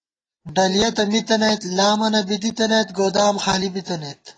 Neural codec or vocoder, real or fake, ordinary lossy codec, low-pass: none; real; AAC, 32 kbps; 9.9 kHz